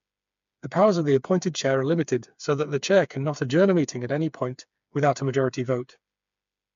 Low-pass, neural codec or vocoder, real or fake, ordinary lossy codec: 7.2 kHz; codec, 16 kHz, 4 kbps, FreqCodec, smaller model; fake; MP3, 64 kbps